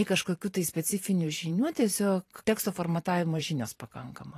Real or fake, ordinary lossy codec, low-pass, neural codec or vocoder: fake; AAC, 48 kbps; 14.4 kHz; vocoder, 44.1 kHz, 128 mel bands every 512 samples, BigVGAN v2